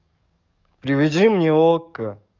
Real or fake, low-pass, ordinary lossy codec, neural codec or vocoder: fake; 7.2 kHz; none; codec, 44.1 kHz, 7.8 kbps, DAC